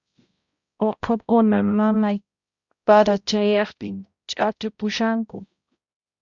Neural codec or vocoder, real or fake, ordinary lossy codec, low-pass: codec, 16 kHz, 0.5 kbps, X-Codec, HuBERT features, trained on balanced general audio; fake; Opus, 64 kbps; 7.2 kHz